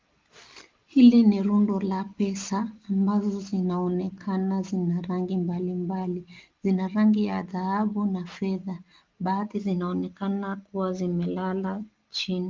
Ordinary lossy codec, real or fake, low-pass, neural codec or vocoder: Opus, 32 kbps; real; 7.2 kHz; none